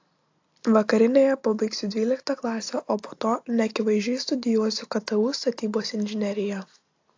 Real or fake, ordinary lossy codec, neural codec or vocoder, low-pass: real; AAC, 48 kbps; none; 7.2 kHz